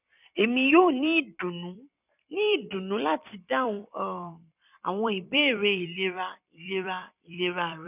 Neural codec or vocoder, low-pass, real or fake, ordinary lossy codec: vocoder, 44.1 kHz, 128 mel bands every 256 samples, BigVGAN v2; 3.6 kHz; fake; none